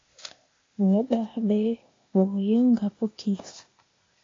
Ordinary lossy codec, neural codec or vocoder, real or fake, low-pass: AAC, 32 kbps; codec, 16 kHz, 0.8 kbps, ZipCodec; fake; 7.2 kHz